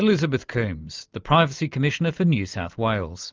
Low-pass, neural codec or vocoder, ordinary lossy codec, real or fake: 7.2 kHz; none; Opus, 32 kbps; real